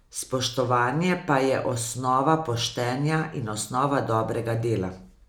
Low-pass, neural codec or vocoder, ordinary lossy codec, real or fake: none; none; none; real